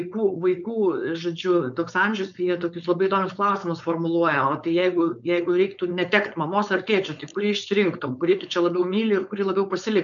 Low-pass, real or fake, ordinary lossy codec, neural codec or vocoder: 7.2 kHz; fake; MP3, 96 kbps; codec, 16 kHz, 4.8 kbps, FACodec